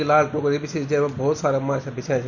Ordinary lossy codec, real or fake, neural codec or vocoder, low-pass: none; real; none; 7.2 kHz